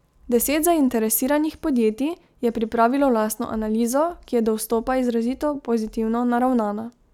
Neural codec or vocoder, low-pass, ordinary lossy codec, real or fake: none; 19.8 kHz; none; real